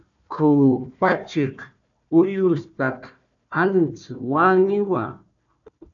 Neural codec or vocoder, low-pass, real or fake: codec, 16 kHz, 1 kbps, FunCodec, trained on Chinese and English, 50 frames a second; 7.2 kHz; fake